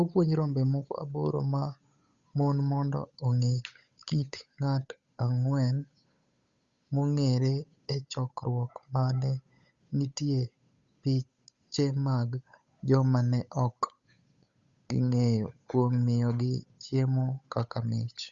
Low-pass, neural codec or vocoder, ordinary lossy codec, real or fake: 7.2 kHz; codec, 16 kHz, 8 kbps, FunCodec, trained on Chinese and English, 25 frames a second; Opus, 64 kbps; fake